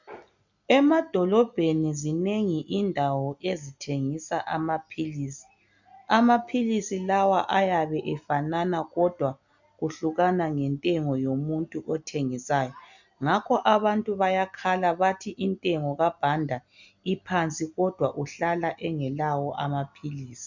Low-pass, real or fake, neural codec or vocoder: 7.2 kHz; real; none